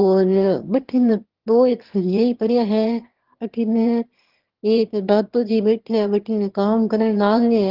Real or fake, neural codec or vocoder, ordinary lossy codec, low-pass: fake; autoencoder, 22.05 kHz, a latent of 192 numbers a frame, VITS, trained on one speaker; Opus, 16 kbps; 5.4 kHz